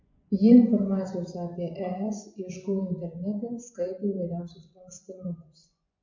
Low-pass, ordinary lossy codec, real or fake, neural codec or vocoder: 7.2 kHz; MP3, 48 kbps; fake; vocoder, 24 kHz, 100 mel bands, Vocos